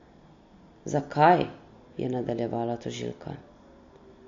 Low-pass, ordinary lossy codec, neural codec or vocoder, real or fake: 7.2 kHz; MP3, 48 kbps; none; real